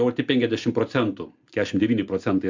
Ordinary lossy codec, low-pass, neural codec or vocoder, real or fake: MP3, 64 kbps; 7.2 kHz; none; real